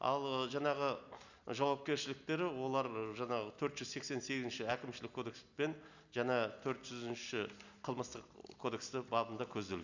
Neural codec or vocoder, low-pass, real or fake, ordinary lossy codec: none; 7.2 kHz; real; none